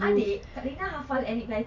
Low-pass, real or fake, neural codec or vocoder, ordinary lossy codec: 7.2 kHz; real; none; MP3, 48 kbps